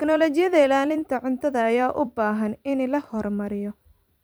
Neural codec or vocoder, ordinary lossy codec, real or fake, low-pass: vocoder, 44.1 kHz, 128 mel bands every 256 samples, BigVGAN v2; none; fake; none